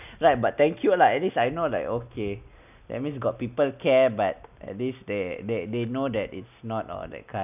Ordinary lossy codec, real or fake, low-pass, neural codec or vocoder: none; real; 3.6 kHz; none